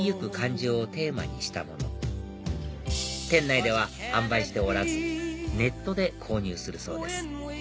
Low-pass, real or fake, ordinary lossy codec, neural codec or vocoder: none; real; none; none